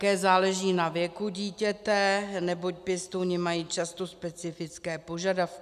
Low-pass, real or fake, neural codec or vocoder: 14.4 kHz; real; none